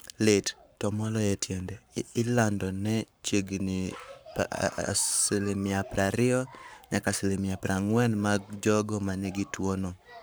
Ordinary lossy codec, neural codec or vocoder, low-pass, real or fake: none; codec, 44.1 kHz, 7.8 kbps, Pupu-Codec; none; fake